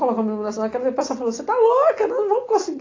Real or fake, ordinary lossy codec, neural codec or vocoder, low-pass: real; AAC, 32 kbps; none; 7.2 kHz